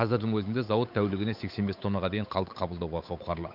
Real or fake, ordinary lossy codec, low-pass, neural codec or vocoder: fake; none; 5.4 kHz; codec, 16 kHz, 8 kbps, FunCodec, trained on Chinese and English, 25 frames a second